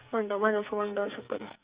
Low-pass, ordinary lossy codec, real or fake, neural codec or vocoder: 3.6 kHz; Opus, 64 kbps; fake; codec, 44.1 kHz, 2.6 kbps, SNAC